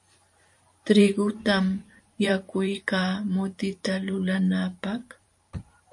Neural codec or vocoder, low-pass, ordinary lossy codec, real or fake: vocoder, 44.1 kHz, 128 mel bands every 512 samples, BigVGAN v2; 10.8 kHz; MP3, 48 kbps; fake